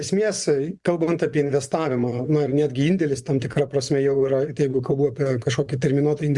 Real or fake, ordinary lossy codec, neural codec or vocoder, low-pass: fake; Opus, 64 kbps; vocoder, 24 kHz, 100 mel bands, Vocos; 10.8 kHz